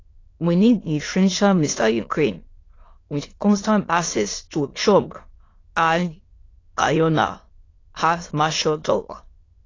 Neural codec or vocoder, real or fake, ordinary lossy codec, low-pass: autoencoder, 22.05 kHz, a latent of 192 numbers a frame, VITS, trained on many speakers; fake; AAC, 32 kbps; 7.2 kHz